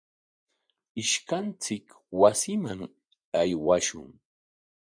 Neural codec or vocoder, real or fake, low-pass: vocoder, 24 kHz, 100 mel bands, Vocos; fake; 9.9 kHz